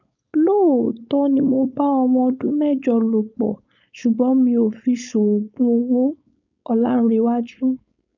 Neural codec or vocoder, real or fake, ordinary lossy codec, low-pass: codec, 16 kHz, 4.8 kbps, FACodec; fake; none; 7.2 kHz